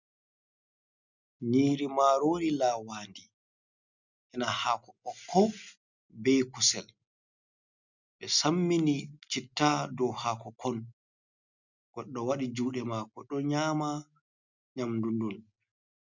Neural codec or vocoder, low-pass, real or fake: none; 7.2 kHz; real